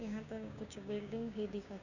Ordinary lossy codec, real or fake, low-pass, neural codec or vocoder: none; fake; 7.2 kHz; codec, 16 kHz, 6 kbps, DAC